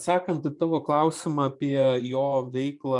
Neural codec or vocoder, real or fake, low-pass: vocoder, 24 kHz, 100 mel bands, Vocos; fake; 10.8 kHz